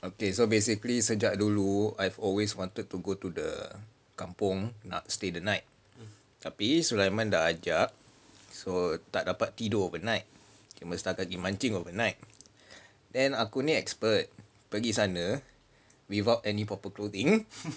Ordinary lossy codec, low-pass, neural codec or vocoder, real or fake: none; none; none; real